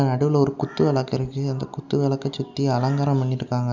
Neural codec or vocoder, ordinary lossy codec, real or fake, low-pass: none; none; real; 7.2 kHz